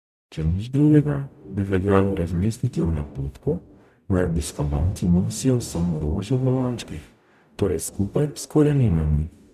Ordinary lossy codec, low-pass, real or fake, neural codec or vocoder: none; 14.4 kHz; fake; codec, 44.1 kHz, 0.9 kbps, DAC